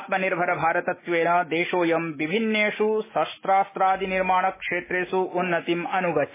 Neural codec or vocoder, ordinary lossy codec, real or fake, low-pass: autoencoder, 48 kHz, 128 numbers a frame, DAC-VAE, trained on Japanese speech; MP3, 16 kbps; fake; 3.6 kHz